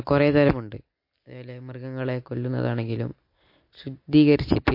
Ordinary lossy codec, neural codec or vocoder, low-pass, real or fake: MP3, 32 kbps; none; 5.4 kHz; real